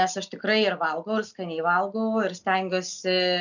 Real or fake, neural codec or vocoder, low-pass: real; none; 7.2 kHz